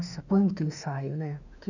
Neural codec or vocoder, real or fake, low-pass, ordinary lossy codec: autoencoder, 48 kHz, 32 numbers a frame, DAC-VAE, trained on Japanese speech; fake; 7.2 kHz; none